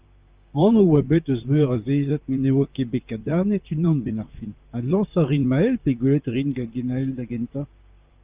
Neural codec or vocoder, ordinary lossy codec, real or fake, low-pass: vocoder, 44.1 kHz, 128 mel bands, Pupu-Vocoder; Opus, 24 kbps; fake; 3.6 kHz